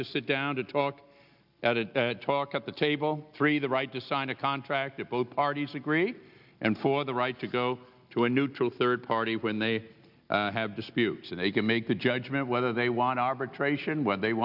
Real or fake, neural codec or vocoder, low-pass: real; none; 5.4 kHz